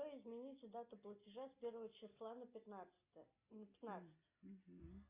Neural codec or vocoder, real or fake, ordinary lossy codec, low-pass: none; real; Opus, 64 kbps; 3.6 kHz